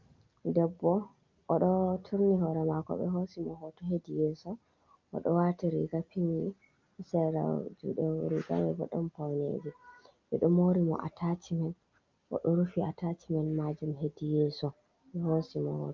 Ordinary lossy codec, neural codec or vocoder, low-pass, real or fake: Opus, 24 kbps; none; 7.2 kHz; real